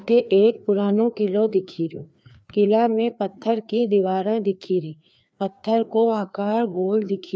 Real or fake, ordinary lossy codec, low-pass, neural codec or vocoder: fake; none; none; codec, 16 kHz, 2 kbps, FreqCodec, larger model